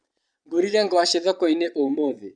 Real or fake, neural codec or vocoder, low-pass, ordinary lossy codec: fake; vocoder, 22.05 kHz, 80 mel bands, Vocos; 9.9 kHz; none